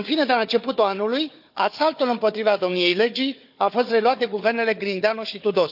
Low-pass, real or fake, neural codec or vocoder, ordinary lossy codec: 5.4 kHz; fake; codec, 16 kHz, 4 kbps, FunCodec, trained on Chinese and English, 50 frames a second; none